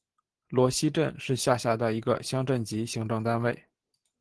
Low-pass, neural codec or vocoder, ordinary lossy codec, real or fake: 10.8 kHz; none; Opus, 16 kbps; real